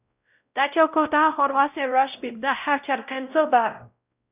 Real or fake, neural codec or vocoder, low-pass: fake; codec, 16 kHz, 0.5 kbps, X-Codec, WavLM features, trained on Multilingual LibriSpeech; 3.6 kHz